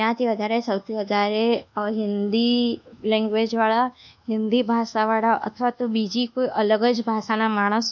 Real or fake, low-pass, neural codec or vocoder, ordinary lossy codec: fake; 7.2 kHz; codec, 24 kHz, 1.2 kbps, DualCodec; none